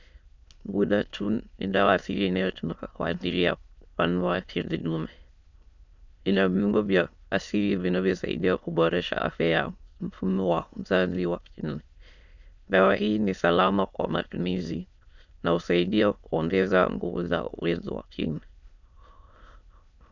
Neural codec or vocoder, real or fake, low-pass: autoencoder, 22.05 kHz, a latent of 192 numbers a frame, VITS, trained on many speakers; fake; 7.2 kHz